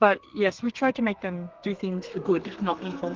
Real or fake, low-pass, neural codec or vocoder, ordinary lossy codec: fake; 7.2 kHz; codec, 32 kHz, 1.9 kbps, SNAC; Opus, 32 kbps